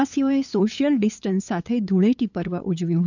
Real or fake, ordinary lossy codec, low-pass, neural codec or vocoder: fake; none; 7.2 kHz; codec, 16 kHz, 8 kbps, FunCodec, trained on LibriTTS, 25 frames a second